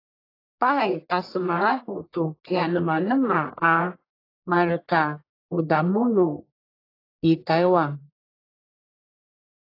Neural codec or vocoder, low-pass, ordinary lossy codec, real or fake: codec, 44.1 kHz, 1.7 kbps, Pupu-Codec; 5.4 kHz; AAC, 24 kbps; fake